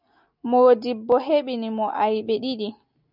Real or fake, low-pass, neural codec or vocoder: real; 5.4 kHz; none